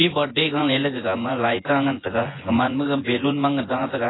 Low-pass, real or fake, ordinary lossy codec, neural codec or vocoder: 7.2 kHz; fake; AAC, 16 kbps; vocoder, 24 kHz, 100 mel bands, Vocos